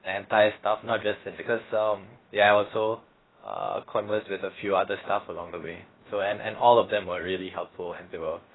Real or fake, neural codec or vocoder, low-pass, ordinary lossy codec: fake; codec, 16 kHz, about 1 kbps, DyCAST, with the encoder's durations; 7.2 kHz; AAC, 16 kbps